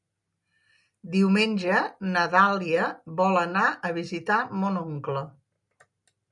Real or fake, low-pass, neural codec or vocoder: real; 10.8 kHz; none